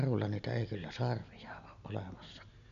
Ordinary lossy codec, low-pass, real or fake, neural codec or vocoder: MP3, 96 kbps; 7.2 kHz; real; none